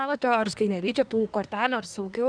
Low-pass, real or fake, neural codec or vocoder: 9.9 kHz; fake; codec, 24 kHz, 1 kbps, SNAC